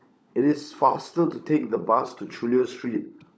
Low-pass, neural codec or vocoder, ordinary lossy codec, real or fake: none; codec, 16 kHz, 16 kbps, FunCodec, trained on LibriTTS, 50 frames a second; none; fake